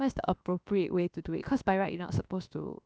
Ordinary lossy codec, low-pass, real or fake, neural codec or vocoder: none; none; fake; codec, 16 kHz, about 1 kbps, DyCAST, with the encoder's durations